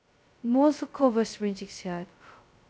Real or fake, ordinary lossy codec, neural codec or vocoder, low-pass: fake; none; codec, 16 kHz, 0.2 kbps, FocalCodec; none